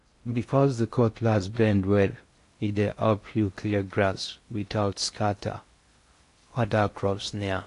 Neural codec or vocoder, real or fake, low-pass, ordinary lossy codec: codec, 16 kHz in and 24 kHz out, 0.6 kbps, FocalCodec, streaming, 2048 codes; fake; 10.8 kHz; AAC, 48 kbps